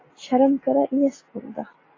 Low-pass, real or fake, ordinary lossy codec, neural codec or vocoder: 7.2 kHz; real; AAC, 32 kbps; none